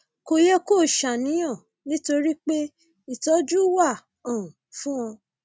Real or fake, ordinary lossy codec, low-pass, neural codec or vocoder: real; none; none; none